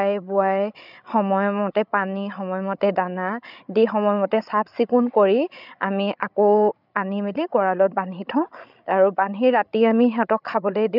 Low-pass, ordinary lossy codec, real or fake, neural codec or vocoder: 5.4 kHz; none; fake; codec, 16 kHz, 16 kbps, FreqCodec, larger model